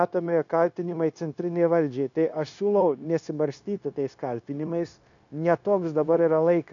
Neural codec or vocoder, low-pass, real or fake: codec, 16 kHz, 0.9 kbps, LongCat-Audio-Codec; 7.2 kHz; fake